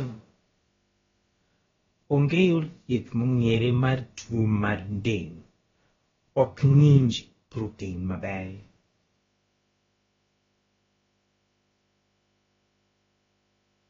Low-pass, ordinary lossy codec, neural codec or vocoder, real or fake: 7.2 kHz; AAC, 24 kbps; codec, 16 kHz, about 1 kbps, DyCAST, with the encoder's durations; fake